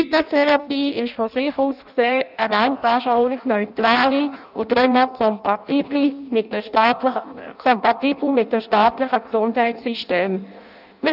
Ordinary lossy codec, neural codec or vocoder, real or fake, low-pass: none; codec, 16 kHz in and 24 kHz out, 0.6 kbps, FireRedTTS-2 codec; fake; 5.4 kHz